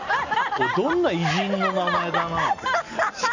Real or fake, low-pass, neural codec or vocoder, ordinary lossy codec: real; 7.2 kHz; none; none